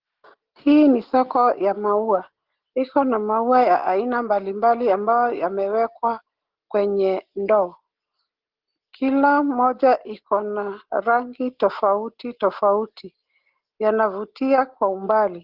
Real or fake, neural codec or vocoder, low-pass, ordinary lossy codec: real; none; 5.4 kHz; Opus, 16 kbps